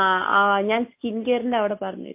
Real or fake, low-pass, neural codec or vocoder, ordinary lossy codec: real; 3.6 kHz; none; MP3, 24 kbps